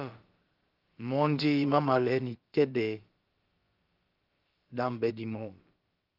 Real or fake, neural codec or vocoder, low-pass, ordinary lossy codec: fake; codec, 16 kHz, about 1 kbps, DyCAST, with the encoder's durations; 5.4 kHz; Opus, 32 kbps